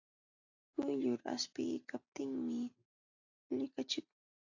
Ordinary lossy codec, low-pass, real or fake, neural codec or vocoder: AAC, 48 kbps; 7.2 kHz; real; none